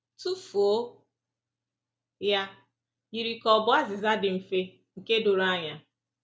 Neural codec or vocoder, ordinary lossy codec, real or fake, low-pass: none; none; real; none